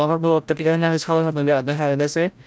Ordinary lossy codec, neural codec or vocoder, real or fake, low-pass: none; codec, 16 kHz, 0.5 kbps, FreqCodec, larger model; fake; none